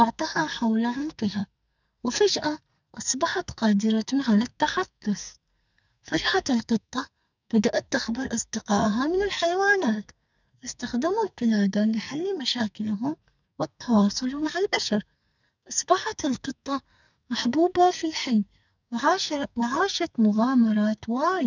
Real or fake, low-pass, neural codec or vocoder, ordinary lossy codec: fake; 7.2 kHz; codec, 44.1 kHz, 2.6 kbps, SNAC; none